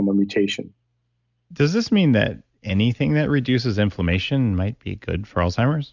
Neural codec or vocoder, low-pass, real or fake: none; 7.2 kHz; real